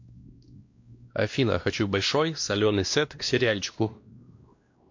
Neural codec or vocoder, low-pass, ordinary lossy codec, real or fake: codec, 16 kHz, 1 kbps, X-Codec, WavLM features, trained on Multilingual LibriSpeech; 7.2 kHz; MP3, 48 kbps; fake